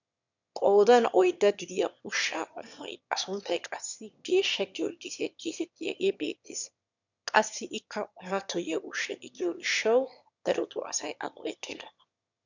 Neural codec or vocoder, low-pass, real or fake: autoencoder, 22.05 kHz, a latent of 192 numbers a frame, VITS, trained on one speaker; 7.2 kHz; fake